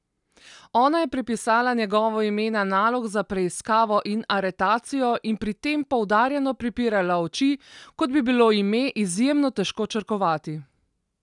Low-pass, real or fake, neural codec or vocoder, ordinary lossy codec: 10.8 kHz; real; none; none